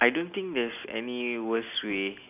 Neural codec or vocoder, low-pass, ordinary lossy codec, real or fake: none; 3.6 kHz; none; real